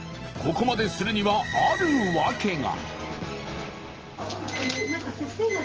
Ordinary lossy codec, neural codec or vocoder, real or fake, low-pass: Opus, 16 kbps; none; real; 7.2 kHz